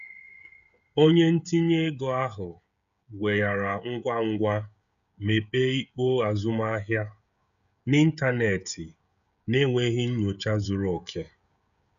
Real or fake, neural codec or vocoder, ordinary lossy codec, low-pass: fake; codec, 16 kHz, 16 kbps, FreqCodec, smaller model; none; 7.2 kHz